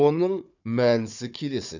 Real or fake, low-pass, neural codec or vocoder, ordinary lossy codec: fake; 7.2 kHz; codec, 16 kHz, 4 kbps, FunCodec, trained on Chinese and English, 50 frames a second; none